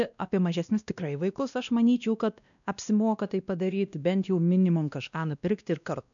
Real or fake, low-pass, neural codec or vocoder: fake; 7.2 kHz; codec, 16 kHz, 1 kbps, X-Codec, WavLM features, trained on Multilingual LibriSpeech